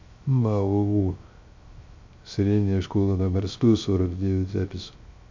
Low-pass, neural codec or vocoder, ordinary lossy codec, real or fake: 7.2 kHz; codec, 16 kHz, 0.3 kbps, FocalCodec; MP3, 48 kbps; fake